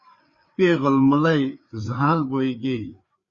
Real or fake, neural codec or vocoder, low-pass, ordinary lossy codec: fake; codec, 16 kHz, 4 kbps, FreqCodec, larger model; 7.2 kHz; Opus, 64 kbps